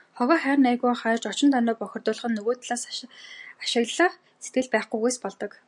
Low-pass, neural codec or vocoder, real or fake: 10.8 kHz; none; real